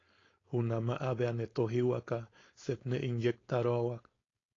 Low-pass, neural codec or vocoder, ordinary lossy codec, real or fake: 7.2 kHz; codec, 16 kHz, 4.8 kbps, FACodec; AAC, 32 kbps; fake